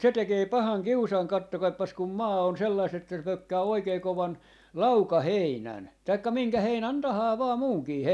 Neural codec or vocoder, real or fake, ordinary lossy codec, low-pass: none; real; none; none